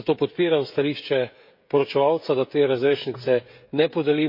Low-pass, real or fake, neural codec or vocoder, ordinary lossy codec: 5.4 kHz; fake; codec, 16 kHz, 16 kbps, FreqCodec, smaller model; MP3, 32 kbps